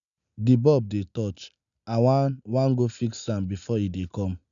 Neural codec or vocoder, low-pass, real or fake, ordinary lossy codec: none; 7.2 kHz; real; none